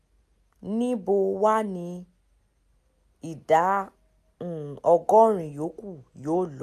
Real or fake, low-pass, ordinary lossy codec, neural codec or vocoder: real; 14.4 kHz; MP3, 96 kbps; none